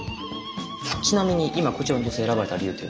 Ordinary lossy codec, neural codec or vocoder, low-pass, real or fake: none; none; none; real